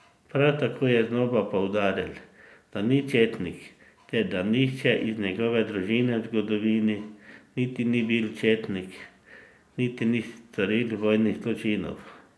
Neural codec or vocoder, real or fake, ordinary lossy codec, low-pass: none; real; none; none